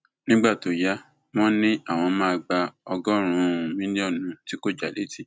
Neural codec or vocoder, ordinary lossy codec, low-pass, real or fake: none; none; none; real